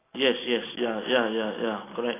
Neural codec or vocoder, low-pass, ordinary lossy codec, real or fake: none; 3.6 kHz; AAC, 16 kbps; real